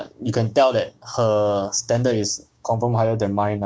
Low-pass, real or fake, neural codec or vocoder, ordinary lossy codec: none; fake; codec, 16 kHz, 6 kbps, DAC; none